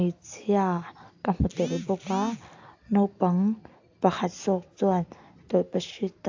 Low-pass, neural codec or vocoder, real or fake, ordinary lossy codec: 7.2 kHz; none; real; AAC, 48 kbps